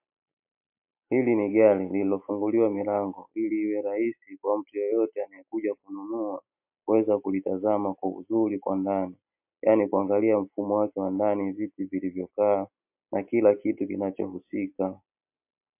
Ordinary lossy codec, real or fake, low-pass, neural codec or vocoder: MP3, 32 kbps; real; 3.6 kHz; none